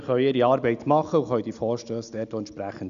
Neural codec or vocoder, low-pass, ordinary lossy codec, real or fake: none; 7.2 kHz; none; real